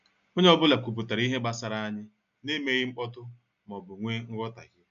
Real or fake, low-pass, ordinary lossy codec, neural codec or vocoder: real; 7.2 kHz; MP3, 96 kbps; none